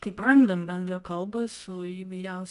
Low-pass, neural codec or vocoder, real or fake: 10.8 kHz; codec, 24 kHz, 0.9 kbps, WavTokenizer, medium music audio release; fake